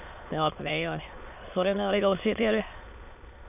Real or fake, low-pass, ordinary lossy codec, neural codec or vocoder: fake; 3.6 kHz; none; autoencoder, 22.05 kHz, a latent of 192 numbers a frame, VITS, trained on many speakers